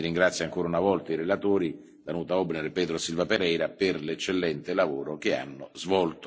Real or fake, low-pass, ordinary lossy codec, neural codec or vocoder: real; none; none; none